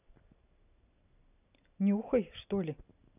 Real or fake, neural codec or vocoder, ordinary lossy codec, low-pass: real; none; none; 3.6 kHz